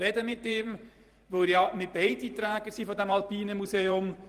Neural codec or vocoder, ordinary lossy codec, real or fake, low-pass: vocoder, 44.1 kHz, 128 mel bands every 512 samples, BigVGAN v2; Opus, 24 kbps; fake; 14.4 kHz